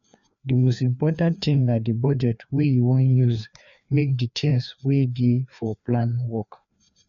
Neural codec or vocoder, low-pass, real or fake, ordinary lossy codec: codec, 16 kHz, 2 kbps, FreqCodec, larger model; 7.2 kHz; fake; MP3, 64 kbps